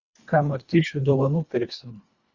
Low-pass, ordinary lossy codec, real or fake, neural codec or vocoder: 7.2 kHz; Opus, 64 kbps; fake; codec, 24 kHz, 1.5 kbps, HILCodec